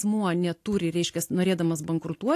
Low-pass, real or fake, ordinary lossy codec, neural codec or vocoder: 14.4 kHz; real; AAC, 64 kbps; none